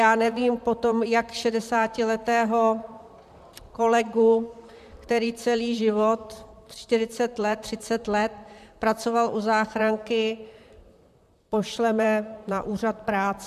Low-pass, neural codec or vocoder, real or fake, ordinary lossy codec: 14.4 kHz; vocoder, 44.1 kHz, 128 mel bands, Pupu-Vocoder; fake; MP3, 96 kbps